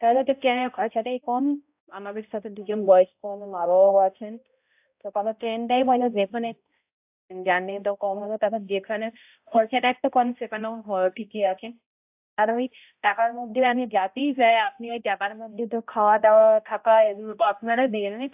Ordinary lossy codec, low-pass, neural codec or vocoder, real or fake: AAC, 32 kbps; 3.6 kHz; codec, 16 kHz, 0.5 kbps, X-Codec, HuBERT features, trained on balanced general audio; fake